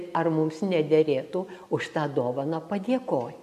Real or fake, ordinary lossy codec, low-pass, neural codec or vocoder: real; AAC, 96 kbps; 14.4 kHz; none